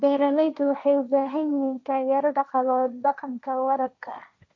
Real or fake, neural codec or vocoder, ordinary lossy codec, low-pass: fake; codec, 16 kHz, 1.1 kbps, Voila-Tokenizer; none; none